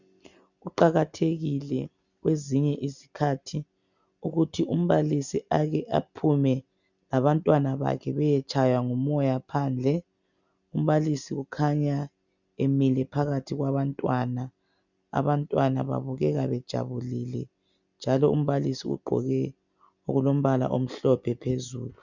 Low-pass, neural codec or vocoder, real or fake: 7.2 kHz; none; real